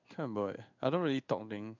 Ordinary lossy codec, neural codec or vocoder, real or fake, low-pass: none; codec, 16 kHz in and 24 kHz out, 1 kbps, XY-Tokenizer; fake; 7.2 kHz